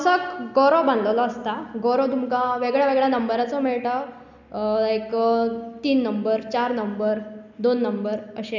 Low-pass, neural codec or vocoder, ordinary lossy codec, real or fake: 7.2 kHz; none; none; real